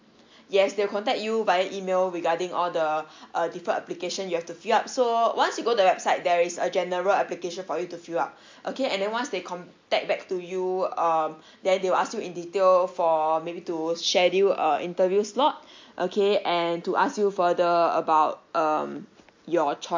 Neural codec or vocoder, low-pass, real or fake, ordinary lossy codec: none; 7.2 kHz; real; MP3, 48 kbps